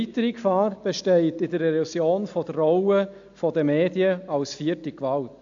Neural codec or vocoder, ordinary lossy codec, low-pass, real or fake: none; AAC, 64 kbps; 7.2 kHz; real